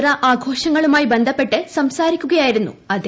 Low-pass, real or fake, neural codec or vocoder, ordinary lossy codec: none; real; none; none